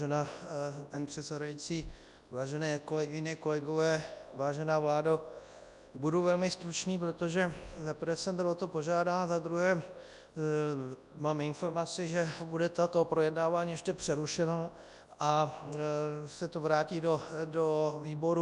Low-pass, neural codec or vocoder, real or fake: 10.8 kHz; codec, 24 kHz, 0.9 kbps, WavTokenizer, large speech release; fake